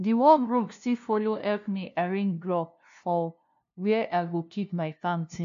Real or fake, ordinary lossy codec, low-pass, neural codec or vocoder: fake; AAC, 64 kbps; 7.2 kHz; codec, 16 kHz, 0.5 kbps, FunCodec, trained on LibriTTS, 25 frames a second